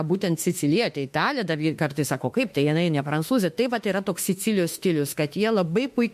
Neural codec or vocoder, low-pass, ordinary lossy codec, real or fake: autoencoder, 48 kHz, 32 numbers a frame, DAC-VAE, trained on Japanese speech; 14.4 kHz; MP3, 64 kbps; fake